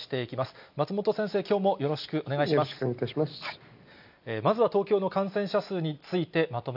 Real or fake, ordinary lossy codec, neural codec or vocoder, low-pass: real; none; none; 5.4 kHz